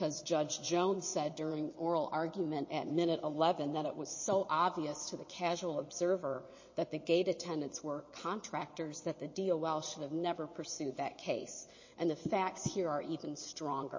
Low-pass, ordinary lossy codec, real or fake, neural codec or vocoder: 7.2 kHz; MP3, 32 kbps; fake; vocoder, 22.05 kHz, 80 mel bands, WaveNeXt